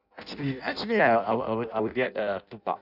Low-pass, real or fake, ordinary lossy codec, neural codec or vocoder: 5.4 kHz; fake; none; codec, 16 kHz in and 24 kHz out, 0.6 kbps, FireRedTTS-2 codec